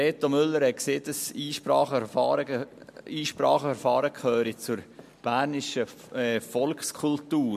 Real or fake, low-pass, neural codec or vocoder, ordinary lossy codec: real; 14.4 kHz; none; MP3, 64 kbps